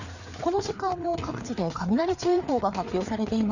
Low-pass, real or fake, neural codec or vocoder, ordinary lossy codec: 7.2 kHz; fake; codec, 16 kHz, 4 kbps, FreqCodec, larger model; none